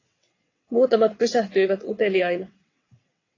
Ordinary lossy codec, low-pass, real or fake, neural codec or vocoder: AAC, 32 kbps; 7.2 kHz; fake; vocoder, 22.05 kHz, 80 mel bands, WaveNeXt